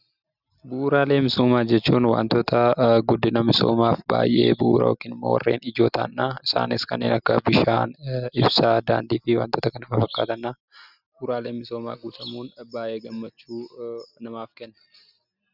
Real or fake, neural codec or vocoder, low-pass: real; none; 5.4 kHz